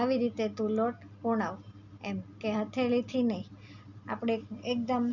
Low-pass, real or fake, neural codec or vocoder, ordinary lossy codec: 7.2 kHz; real; none; none